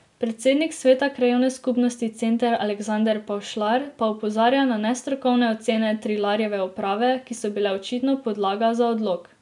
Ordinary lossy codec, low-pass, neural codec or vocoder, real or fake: none; 10.8 kHz; none; real